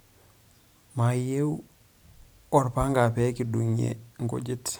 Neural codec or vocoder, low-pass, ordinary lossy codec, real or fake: vocoder, 44.1 kHz, 128 mel bands every 512 samples, BigVGAN v2; none; none; fake